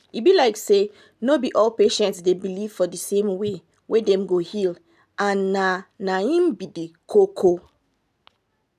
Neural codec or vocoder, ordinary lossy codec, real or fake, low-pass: none; none; real; 14.4 kHz